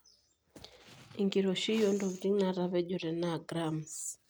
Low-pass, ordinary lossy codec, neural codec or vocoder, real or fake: none; none; none; real